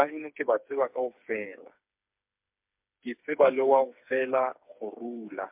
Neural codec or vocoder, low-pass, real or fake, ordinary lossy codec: codec, 16 kHz, 4 kbps, FreqCodec, smaller model; 3.6 kHz; fake; MP3, 32 kbps